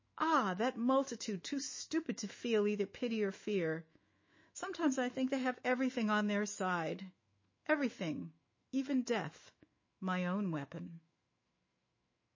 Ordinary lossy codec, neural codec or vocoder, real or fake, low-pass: MP3, 32 kbps; autoencoder, 48 kHz, 128 numbers a frame, DAC-VAE, trained on Japanese speech; fake; 7.2 kHz